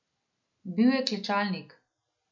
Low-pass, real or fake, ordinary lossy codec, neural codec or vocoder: 7.2 kHz; real; MP3, 48 kbps; none